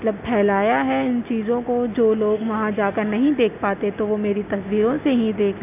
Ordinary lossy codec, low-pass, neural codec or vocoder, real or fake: none; 3.6 kHz; none; real